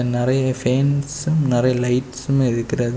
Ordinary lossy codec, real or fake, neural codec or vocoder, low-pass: none; real; none; none